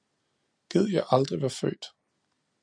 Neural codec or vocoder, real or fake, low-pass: none; real; 9.9 kHz